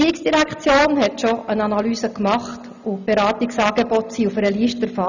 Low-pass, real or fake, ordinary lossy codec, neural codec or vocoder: 7.2 kHz; real; none; none